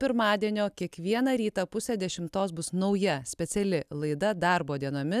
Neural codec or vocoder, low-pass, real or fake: none; 14.4 kHz; real